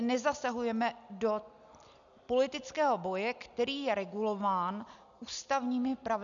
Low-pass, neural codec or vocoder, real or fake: 7.2 kHz; none; real